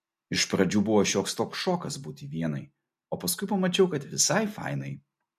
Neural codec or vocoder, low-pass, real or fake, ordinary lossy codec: none; 14.4 kHz; real; MP3, 64 kbps